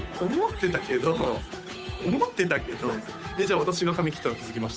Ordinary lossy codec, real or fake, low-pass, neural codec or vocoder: none; fake; none; codec, 16 kHz, 8 kbps, FunCodec, trained on Chinese and English, 25 frames a second